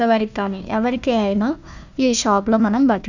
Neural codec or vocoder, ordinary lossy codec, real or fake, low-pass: codec, 16 kHz, 1 kbps, FunCodec, trained on Chinese and English, 50 frames a second; none; fake; 7.2 kHz